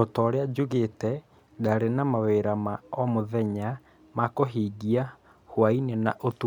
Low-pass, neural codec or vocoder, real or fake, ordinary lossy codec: 19.8 kHz; none; real; MP3, 96 kbps